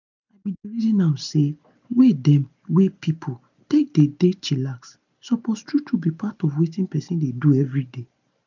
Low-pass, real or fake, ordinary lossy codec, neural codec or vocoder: 7.2 kHz; real; none; none